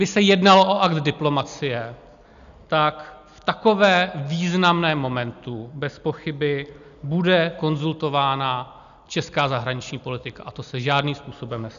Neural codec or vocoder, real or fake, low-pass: none; real; 7.2 kHz